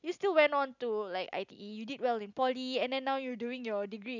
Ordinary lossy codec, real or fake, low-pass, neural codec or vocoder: none; real; 7.2 kHz; none